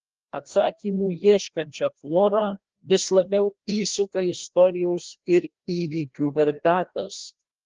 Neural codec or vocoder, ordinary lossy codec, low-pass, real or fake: codec, 16 kHz, 1 kbps, FreqCodec, larger model; Opus, 16 kbps; 7.2 kHz; fake